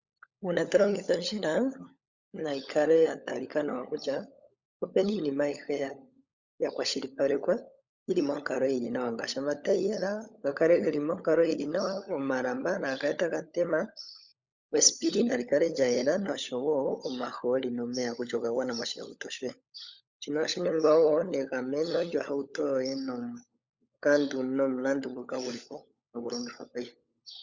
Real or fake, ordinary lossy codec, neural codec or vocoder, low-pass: fake; Opus, 64 kbps; codec, 16 kHz, 16 kbps, FunCodec, trained on LibriTTS, 50 frames a second; 7.2 kHz